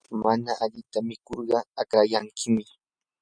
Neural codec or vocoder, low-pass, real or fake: none; 9.9 kHz; real